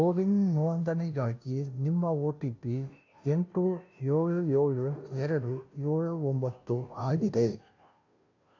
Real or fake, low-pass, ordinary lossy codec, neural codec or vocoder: fake; 7.2 kHz; AAC, 48 kbps; codec, 16 kHz, 0.5 kbps, FunCodec, trained on Chinese and English, 25 frames a second